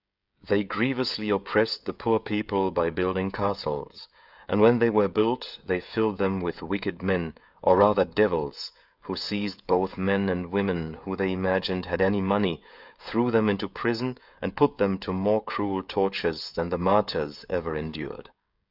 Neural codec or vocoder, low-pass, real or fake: codec, 16 kHz, 16 kbps, FreqCodec, smaller model; 5.4 kHz; fake